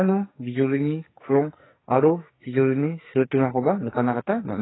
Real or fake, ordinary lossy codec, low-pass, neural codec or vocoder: fake; AAC, 16 kbps; 7.2 kHz; codec, 44.1 kHz, 2.6 kbps, SNAC